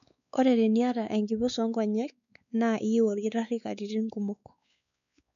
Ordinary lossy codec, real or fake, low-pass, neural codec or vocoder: MP3, 96 kbps; fake; 7.2 kHz; codec, 16 kHz, 4 kbps, X-Codec, WavLM features, trained on Multilingual LibriSpeech